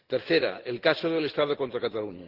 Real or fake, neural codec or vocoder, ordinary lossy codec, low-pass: real; none; Opus, 16 kbps; 5.4 kHz